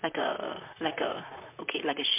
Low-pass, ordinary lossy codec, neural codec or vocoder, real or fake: 3.6 kHz; MP3, 32 kbps; vocoder, 44.1 kHz, 128 mel bands, Pupu-Vocoder; fake